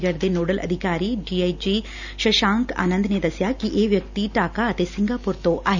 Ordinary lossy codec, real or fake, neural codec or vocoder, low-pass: none; real; none; 7.2 kHz